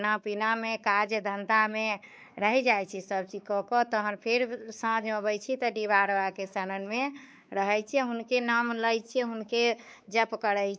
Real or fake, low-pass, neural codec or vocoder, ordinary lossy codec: fake; none; codec, 16 kHz, 4 kbps, X-Codec, WavLM features, trained on Multilingual LibriSpeech; none